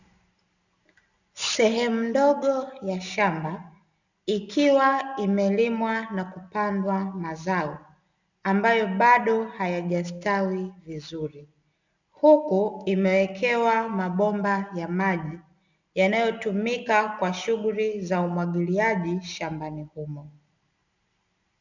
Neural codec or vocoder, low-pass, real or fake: none; 7.2 kHz; real